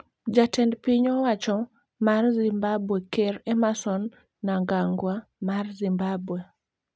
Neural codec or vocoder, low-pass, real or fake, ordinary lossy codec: none; none; real; none